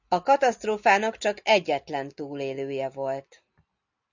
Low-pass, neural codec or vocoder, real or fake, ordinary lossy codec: 7.2 kHz; none; real; Opus, 64 kbps